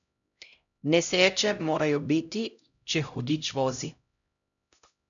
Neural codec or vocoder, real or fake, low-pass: codec, 16 kHz, 0.5 kbps, X-Codec, HuBERT features, trained on LibriSpeech; fake; 7.2 kHz